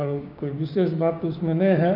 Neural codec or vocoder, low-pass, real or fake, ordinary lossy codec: codec, 16 kHz, 6 kbps, DAC; 5.4 kHz; fake; none